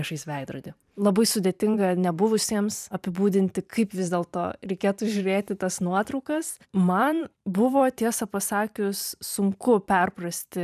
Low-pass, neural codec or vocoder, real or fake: 14.4 kHz; vocoder, 44.1 kHz, 128 mel bands every 512 samples, BigVGAN v2; fake